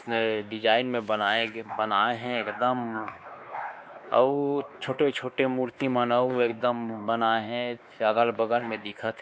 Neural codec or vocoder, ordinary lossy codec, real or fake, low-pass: codec, 16 kHz, 2 kbps, X-Codec, WavLM features, trained on Multilingual LibriSpeech; none; fake; none